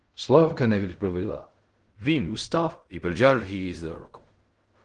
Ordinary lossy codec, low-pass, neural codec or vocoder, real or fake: Opus, 24 kbps; 10.8 kHz; codec, 16 kHz in and 24 kHz out, 0.4 kbps, LongCat-Audio-Codec, fine tuned four codebook decoder; fake